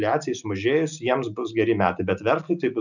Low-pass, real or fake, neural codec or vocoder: 7.2 kHz; real; none